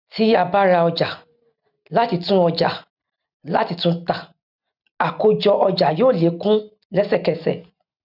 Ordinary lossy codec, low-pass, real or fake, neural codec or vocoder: none; 5.4 kHz; real; none